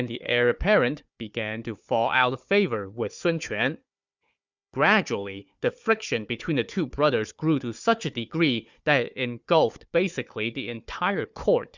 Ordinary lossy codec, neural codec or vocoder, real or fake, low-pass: Opus, 64 kbps; codec, 16 kHz, 4 kbps, FunCodec, trained on Chinese and English, 50 frames a second; fake; 7.2 kHz